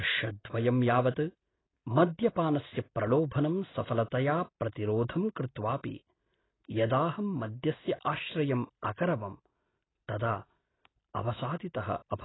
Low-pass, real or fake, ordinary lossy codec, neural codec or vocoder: 7.2 kHz; real; AAC, 16 kbps; none